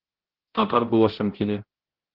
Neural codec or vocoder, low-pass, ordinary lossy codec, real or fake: codec, 16 kHz, 0.5 kbps, X-Codec, HuBERT features, trained on balanced general audio; 5.4 kHz; Opus, 16 kbps; fake